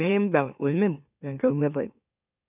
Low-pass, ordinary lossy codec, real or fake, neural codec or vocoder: 3.6 kHz; none; fake; autoencoder, 44.1 kHz, a latent of 192 numbers a frame, MeloTTS